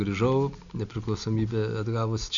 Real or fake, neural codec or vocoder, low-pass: real; none; 7.2 kHz